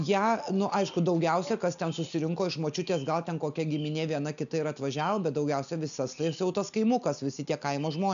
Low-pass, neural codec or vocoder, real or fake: 7.2 kHz; none; real